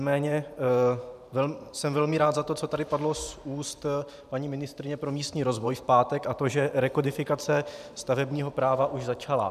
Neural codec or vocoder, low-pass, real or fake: vocoder, 44.1 kHz, 128 mel bands every 512 samples, BigVGAN v2; 14.4 kHz; fake